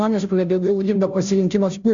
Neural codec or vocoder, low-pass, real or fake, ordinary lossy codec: codec, 16 kHz, 0.5 kbps, FunCodec, trained on Chinese and English, 25 frames a second; 7.2 kHz; fake; MP3, 64 kbps